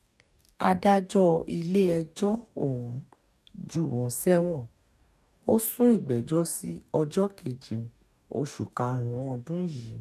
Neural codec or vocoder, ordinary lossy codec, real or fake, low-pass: codec, 44.1 kHz, 2.6 kbps, DAC; none; fake; 14.4 kHz